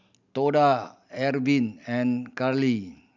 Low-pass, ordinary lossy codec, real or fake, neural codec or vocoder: 7.2 kHz; none; real; none